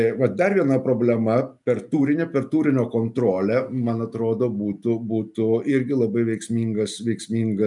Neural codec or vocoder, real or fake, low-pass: none; real; 10.8 kHz